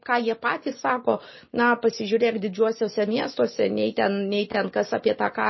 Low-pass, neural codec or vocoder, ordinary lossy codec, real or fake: 7.2 kHz; none; MP3, 24 kbps; real